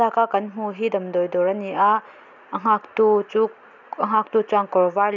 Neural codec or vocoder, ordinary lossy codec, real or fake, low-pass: none; none; real; 7.2 kHz